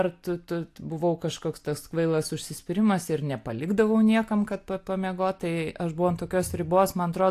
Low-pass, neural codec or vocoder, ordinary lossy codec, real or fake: 14.4 kHz; none; AAC, 64 kbps; real